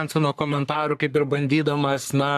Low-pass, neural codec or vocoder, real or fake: 14.4 kHz; codec, 44.1 kHz, 3.4 kbps, Pupu-Codec; fake